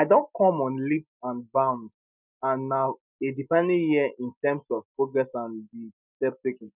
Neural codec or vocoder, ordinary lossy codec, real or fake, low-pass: none; none; real; 3.6 kHz